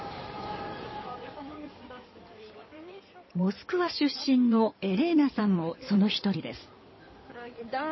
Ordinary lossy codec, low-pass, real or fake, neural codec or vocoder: MP3, 24 kbps; 7.2 kHz; fake; codec, 16 kHz in and 24 kHz out, 2.2 kbps, FireRedTTS-2 codec